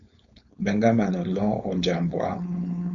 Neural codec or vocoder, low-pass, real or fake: codec, 16 kHz, 4.8 kbps, FACodec; 7.2 kHz; fake